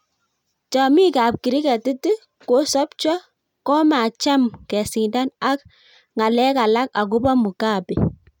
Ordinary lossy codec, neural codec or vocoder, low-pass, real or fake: none; none; 19.8 kHz; real